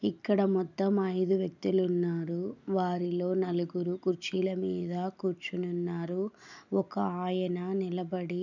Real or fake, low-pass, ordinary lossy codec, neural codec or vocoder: real; 7.2 kHz; none; none